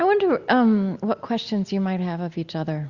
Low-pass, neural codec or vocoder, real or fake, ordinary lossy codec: 7.2 kHz; none; real; Opus, 64 kbps